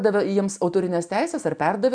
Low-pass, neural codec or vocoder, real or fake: 9.9 kHz; none; real